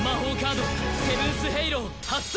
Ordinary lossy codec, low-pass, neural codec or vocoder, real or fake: none; none; none; real